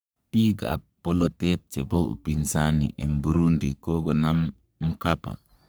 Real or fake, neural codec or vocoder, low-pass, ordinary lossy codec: fake; codec, 44.1 kHz, 3.4 kbps, Pupu-Codec; none; none